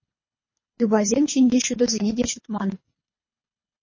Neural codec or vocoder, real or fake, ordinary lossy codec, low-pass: codec, 24 kHz, 3 kbps, HILCodec; fake; MP3, 32 kbps; 7.2 kHz